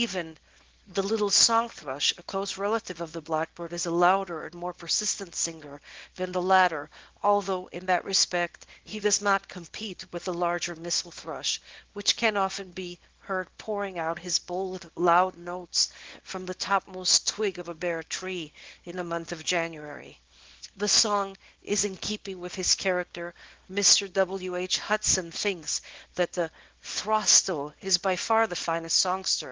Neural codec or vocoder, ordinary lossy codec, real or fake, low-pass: codec, 24 kHz, 0.9 kbps, WavTokenizer, small release; Opus, 16 kbps; fake; 7.2 kHz